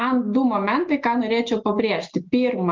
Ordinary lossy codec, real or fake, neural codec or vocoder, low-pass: Opus, 24 kbps; real; none; 7.2 kHz